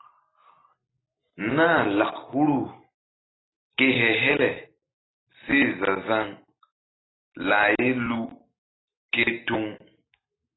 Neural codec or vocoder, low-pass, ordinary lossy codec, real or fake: none; 7.2 kHz; AAC, 16 kbps; real